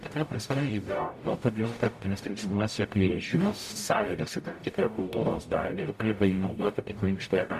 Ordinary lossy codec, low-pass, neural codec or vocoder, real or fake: MP3, 96 kbps; 14.4 kHz; codec, 44.1 kHz, 0.9 kbps, DAC; fake